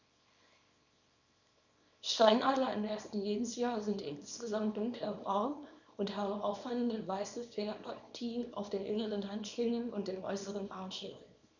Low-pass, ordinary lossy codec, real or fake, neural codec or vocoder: 7.2 kHz; none; fake; codec, 24 kHz, 0.9 kbps, WavTokenizer, small release